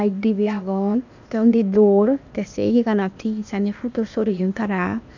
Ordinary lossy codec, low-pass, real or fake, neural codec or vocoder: none; 7.2 kHz; fake; codec, 16 kHz, 0.8 kbps, ZipCodec